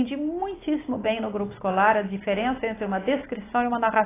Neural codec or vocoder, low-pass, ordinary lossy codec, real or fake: none; 3.6 kHz; AAC, 16 kbps; real